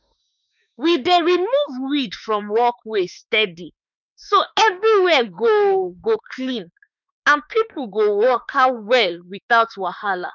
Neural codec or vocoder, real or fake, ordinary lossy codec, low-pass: autoencoder, 48 kHz, 32 numbers a frame, DAC-VAE, trained on Japanese speech; fake; none; 7.2 kHz